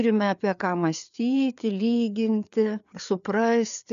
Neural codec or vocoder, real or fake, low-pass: codec, 16 kHz, 4 kbps, FreqCodec, larger model; fake; 7.2 kHz